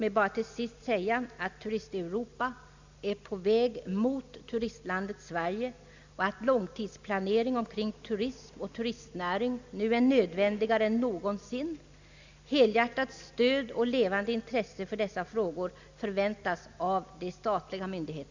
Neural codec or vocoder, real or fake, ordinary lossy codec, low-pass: none; real; Opus, 64 kbps; 7.2 kHz